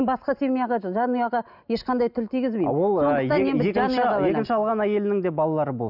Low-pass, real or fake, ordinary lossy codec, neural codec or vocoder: 5.4 kHz; real; none; none